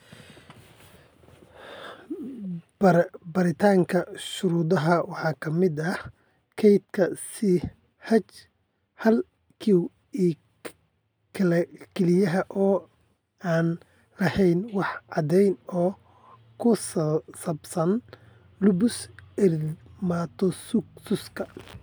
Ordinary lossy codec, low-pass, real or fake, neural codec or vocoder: none; none; real; none